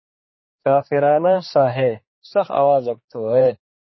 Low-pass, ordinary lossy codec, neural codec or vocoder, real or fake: 7.2 kHz; MP3, 24 kbps; codec, 16 kHz, 4 kbps, X-Codec, HuBERT features, trained on general audio; fake